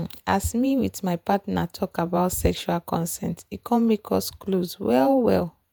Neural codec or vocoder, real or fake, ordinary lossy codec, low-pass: vocoder, 48 kHz, 128 mel bands, Vocos; fake; none; none